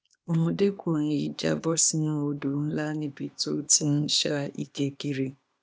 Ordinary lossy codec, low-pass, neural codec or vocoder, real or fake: none; none; codec, 16 kHz, 0.8 kbps, ZipCodec; fake